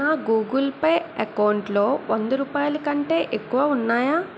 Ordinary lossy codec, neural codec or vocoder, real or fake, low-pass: none; none; real; none